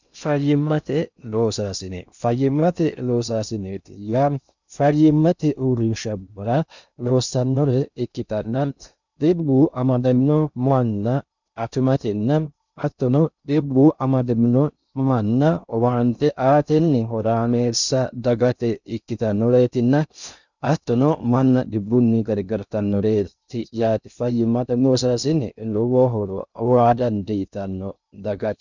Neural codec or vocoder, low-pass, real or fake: codec, 16 kHz in and 24 kHz out, 0.6 kbps, FocalCodec, streaming, 2048 codes; 7.2 kHz; fake